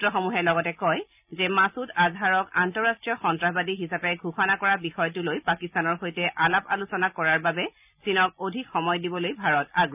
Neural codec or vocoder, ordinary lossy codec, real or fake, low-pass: none; none; real; 3.6 kHz